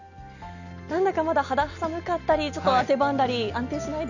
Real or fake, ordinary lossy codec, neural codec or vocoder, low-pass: real; none; none; 7.2 kHz